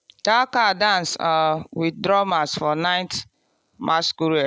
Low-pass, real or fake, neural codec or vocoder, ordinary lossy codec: none; real; none; none